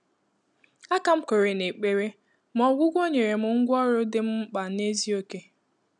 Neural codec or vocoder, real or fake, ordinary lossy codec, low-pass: none; real; none; 10.8 kHz